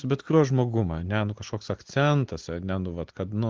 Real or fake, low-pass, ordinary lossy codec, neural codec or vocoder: real; 7.2 kHz; Opus, 16 kbps; none